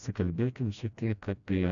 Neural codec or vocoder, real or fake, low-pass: codec, 16 kHz, 1 kbps, FreqCodec, smaller model; fake; 7.2 kHz